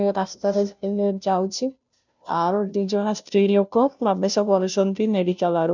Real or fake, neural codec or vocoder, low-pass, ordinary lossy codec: fake; codec, 16 kHz, 0.5 kbps, FunCodec, trained on Chinese and English, 25 frames a second; 7.2 kHz; none